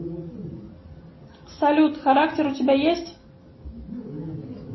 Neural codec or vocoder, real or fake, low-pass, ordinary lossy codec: none; real; 7.2 kHz; MP3, 24 kbps